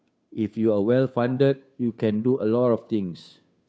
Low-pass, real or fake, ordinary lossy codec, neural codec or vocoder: none; fake; none; codec, 16 kHz, 2 kbps, FunCodec, trained on Chinese and English, 25 frames a second